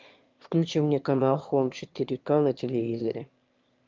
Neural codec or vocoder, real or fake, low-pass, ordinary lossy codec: autoencoder, 22.05 kHz, a latent of 192 numbers a frame, VITS, trained on one speaker; fake; 7.2 kHz; Opus, 24 kbps